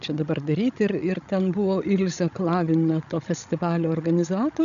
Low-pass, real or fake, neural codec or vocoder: 7.2 kHz; fake; codec, 16 kHz, 16 kbps, FreqCodec, larger model